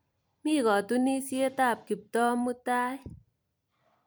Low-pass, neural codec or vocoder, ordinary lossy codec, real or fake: none; vocoder, 44.1 kHz, 128 mel bands every 256 samples, BigVGAN v2; none; fake